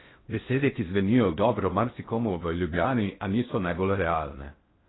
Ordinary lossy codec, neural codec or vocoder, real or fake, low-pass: AAC, 16 kbps; codec, 16 kHz in and 24 kHz out, 0.6 kbps, FocalCodec, streaming, 4096 codes; fake; 7.2 kHz